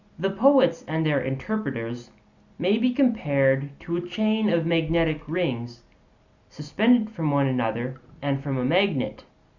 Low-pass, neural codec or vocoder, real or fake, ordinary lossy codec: 7.2 kHz; none; real; Opus, 64 kbps